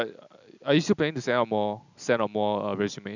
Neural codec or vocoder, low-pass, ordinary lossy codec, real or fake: none; 7.2 kHz; none; real